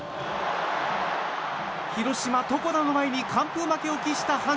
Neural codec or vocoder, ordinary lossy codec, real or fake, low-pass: none; none; real; none